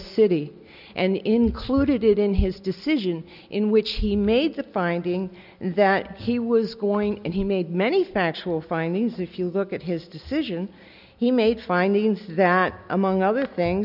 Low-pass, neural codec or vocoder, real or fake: 5.4 kHz; none; real